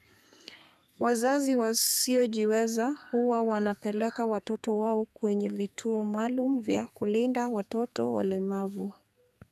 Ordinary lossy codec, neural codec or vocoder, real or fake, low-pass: none; codec, 32 kHz, 1.9 kbps, SNAC; fake; 14.4 kHz